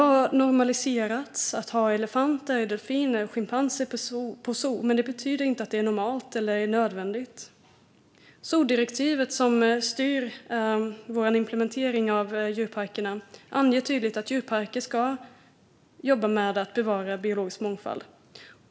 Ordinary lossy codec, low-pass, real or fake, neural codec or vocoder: none; none; real; none